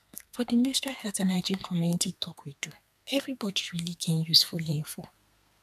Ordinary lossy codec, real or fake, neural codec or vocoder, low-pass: none; fake; codec, 32 kHz, 1.9 kbps, SNAC; 14.4 kHz